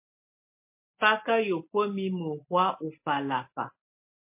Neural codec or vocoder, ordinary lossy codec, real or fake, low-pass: none; MP3, 24 kbps; real; 3.6 kHz